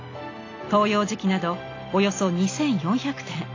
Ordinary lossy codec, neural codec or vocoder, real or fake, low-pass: AAC, 32 kbps; none; real; 7.2 kHz